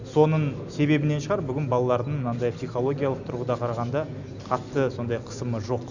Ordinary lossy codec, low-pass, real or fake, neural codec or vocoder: none; 7.2 kHz; real; none